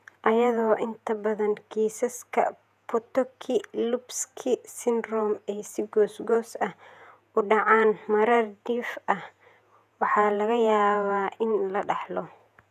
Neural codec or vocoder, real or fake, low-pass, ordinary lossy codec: vocoder, 48 kHz, 128 mel bands, Vocos; fake; 14.4 kHz; none